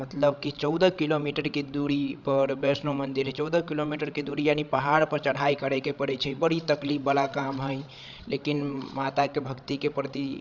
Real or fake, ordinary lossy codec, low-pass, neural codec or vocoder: fake; none; 7.2 kHz; codec, 16 kHz, 16 kbps, FreqCodec, larger model